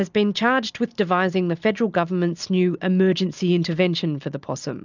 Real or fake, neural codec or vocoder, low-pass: real; none; 7.2 kHz